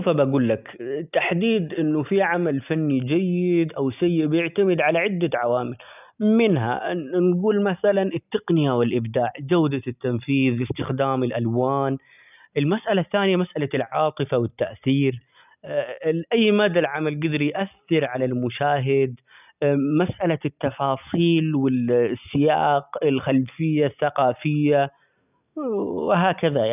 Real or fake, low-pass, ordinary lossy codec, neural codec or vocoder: real; 3.6 kHz; none; none